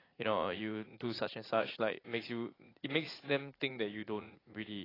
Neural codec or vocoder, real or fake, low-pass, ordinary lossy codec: vocoder, 44.1 kHz, 80 mel bands, Vocos; fake; 5.4 kHz; AAC, 24 kbps